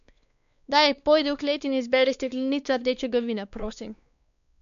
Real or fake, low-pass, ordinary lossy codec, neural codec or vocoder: fake; 7.2 kHz; MP3, 96 kbps; codec, 16 kHz, 2 kbps, X-Codec, WavLM features, trained on Multilingual LibriSpeech